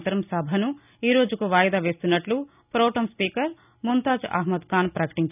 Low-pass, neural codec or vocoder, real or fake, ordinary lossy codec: 3.6 kHz; none; real; none